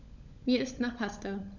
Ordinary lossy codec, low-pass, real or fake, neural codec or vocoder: none; 7.2 kHz; fake; codec, 16 kHz, 16 kbps, FunCodec, trained on LibriTTS, 50 frames a second